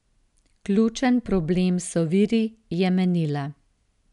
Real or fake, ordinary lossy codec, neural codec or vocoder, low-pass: real; none; none; 10.8 kHz